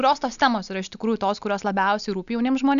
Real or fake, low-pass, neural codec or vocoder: real; 7.2 kHz; none